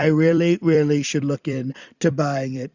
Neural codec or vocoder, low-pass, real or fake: vocoder, 44.1 kHz, 128 mel bands every 256 samples, BigVGAN v2; 7.2 kHz; fake